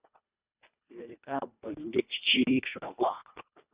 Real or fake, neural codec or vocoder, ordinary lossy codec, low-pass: fake; codec, 24 kHz, 1.5 kbps, HILCodec; Opus, 64 kbps; 3.6 kHz